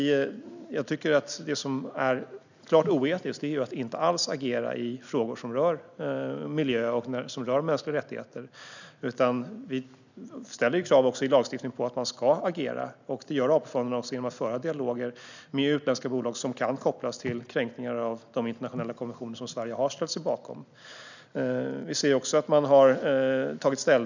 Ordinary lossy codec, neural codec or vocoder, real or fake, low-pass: none; none; real; 7.2 kHz